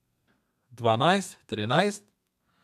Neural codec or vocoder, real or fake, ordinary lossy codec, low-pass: codec, 32 kHz, 1.9 kbps, SNAC; fake; none; 14.4 kHz